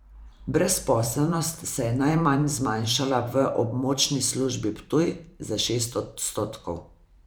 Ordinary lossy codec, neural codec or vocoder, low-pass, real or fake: none; vocoder, 44.1 kHz, 128 mel bands every 512 samples, BigVGAN v2; none; fake